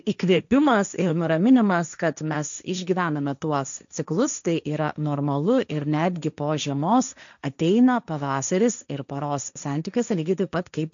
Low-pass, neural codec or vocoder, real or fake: 7.2 kHz; codec, 16 kHz, 1.1 kbps, Voila-Tokenizer; fake